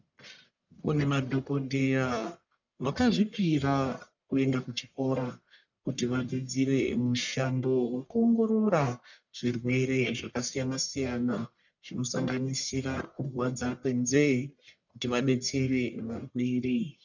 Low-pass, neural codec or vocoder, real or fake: 7.2 kHz; codec, 44.1 kHz, 1.7 kbps, Pupu-Codec; fake